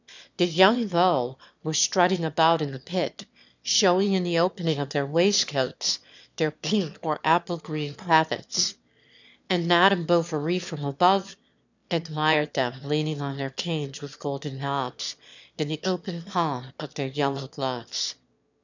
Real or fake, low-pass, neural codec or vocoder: fake; 7.2 kHz; autoencoder, 22.05 kHz, a latent of 192 numbers a frame, VITS, trained on one speaker